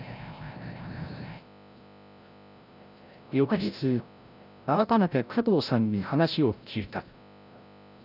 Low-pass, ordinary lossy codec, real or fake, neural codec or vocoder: 5.4 kHz; none; fake; codec, 16 kHz, 0.5 kbps, FreqCodec, larger model